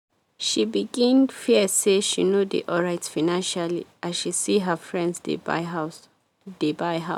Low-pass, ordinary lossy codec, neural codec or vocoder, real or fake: 19.8 kHz; none; none; real